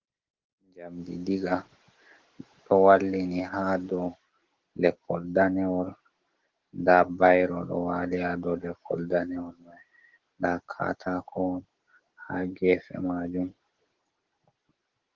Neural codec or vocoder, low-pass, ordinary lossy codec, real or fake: none; 7.2 kHz; Opus, 16 kbps; real